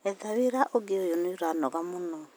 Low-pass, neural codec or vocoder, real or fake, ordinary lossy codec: none; none; real; none